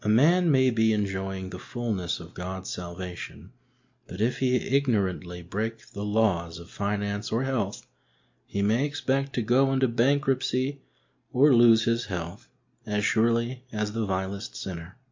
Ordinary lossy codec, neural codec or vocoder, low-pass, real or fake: MP3, 48 kbps; none; 7.2 kHz; real